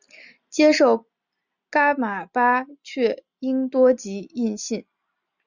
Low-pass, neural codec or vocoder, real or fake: 7.2 kHz; none; real